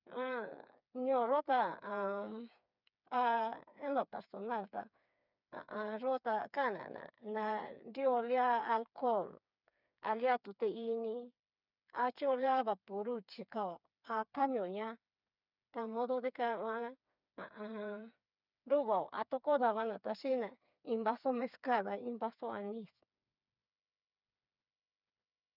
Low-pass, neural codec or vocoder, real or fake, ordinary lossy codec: 5.4 kHz; codec, 16 kHz, 4 kbps, FreqCodec, smaller model; fake; none